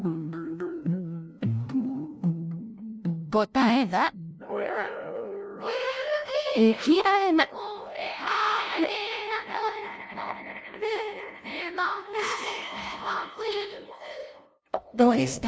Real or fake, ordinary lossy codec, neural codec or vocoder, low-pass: fake; none; codec, 16 kHz, 0.5 kbps, FunCodec, trained on LibriTTS, 25 frames a second; none